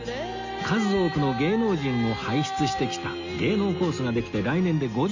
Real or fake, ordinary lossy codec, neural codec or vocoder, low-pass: real; Opus, 64 kbps; none; 7.2 kHz